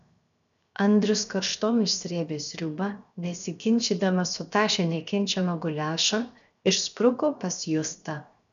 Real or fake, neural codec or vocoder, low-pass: fake; codec, 16 kHz, 0.7 kbps, FocalCodec; 7.2 kHz